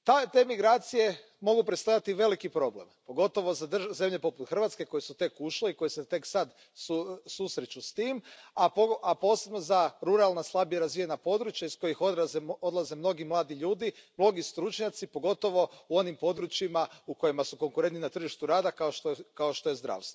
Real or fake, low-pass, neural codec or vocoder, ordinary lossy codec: real; none; none; none